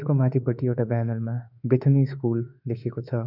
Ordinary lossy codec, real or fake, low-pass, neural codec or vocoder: none; fake; 5.4 kHz; autoencoder, 48 kHz, 32 numbers a frame, DAC-VAE, trained on Japanese speech